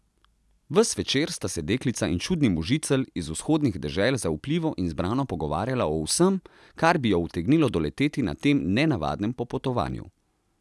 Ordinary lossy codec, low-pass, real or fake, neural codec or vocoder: none; none; real; none